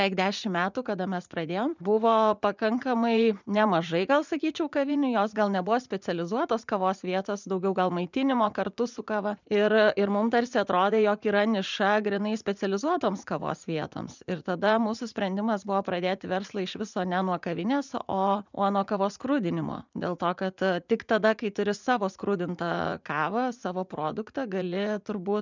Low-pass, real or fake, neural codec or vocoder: 7.2 kHz; fake; vocoder, 44.1 kHz, 80 mel bands, Vocos